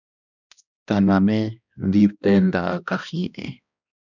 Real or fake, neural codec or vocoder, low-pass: fake; codec, 16 kHz, 1 kbps, X-Codec, HuBERT features, trained on balanced general audio; 7.2 kHz